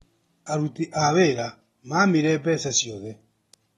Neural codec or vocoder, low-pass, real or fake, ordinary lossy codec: none; 19.8 kHz; real; AAC, 32 kbps